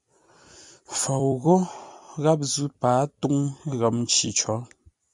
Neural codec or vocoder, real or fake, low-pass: vocoder, 44.1 kHz, 128 mel bands every 256 samples, BigVGAN v2; fake; 10.8 kHz